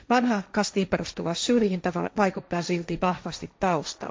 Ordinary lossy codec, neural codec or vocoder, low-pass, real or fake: none; codec, 16 kHz, 1.1 kbps, Voila-Tokenizer; none; fake